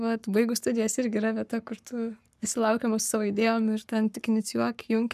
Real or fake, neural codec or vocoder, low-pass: fake; codec, 44.1 kHz, 7.8 kbps, Pupu-Codec; 14.4 kHz